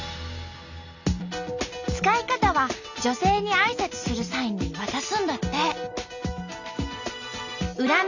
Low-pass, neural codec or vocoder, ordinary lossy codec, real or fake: 7.2 kHz; none; none; real